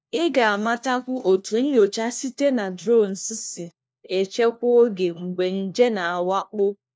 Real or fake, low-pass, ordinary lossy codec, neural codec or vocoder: fake; none; none; codec, 16 kHz, 1 kbps, FunCodec, trained on LibriTTS, 50 frames a second